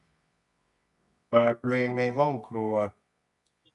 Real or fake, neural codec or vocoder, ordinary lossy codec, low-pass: fake; codec, 24 kHz, 0.9 kbps, WavTokenizer, medium music audio release; MP3, 96 kbps; 10.8 kHz